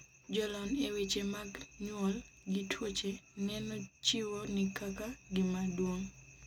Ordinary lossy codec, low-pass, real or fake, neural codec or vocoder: none; 19.8 kHz; real; none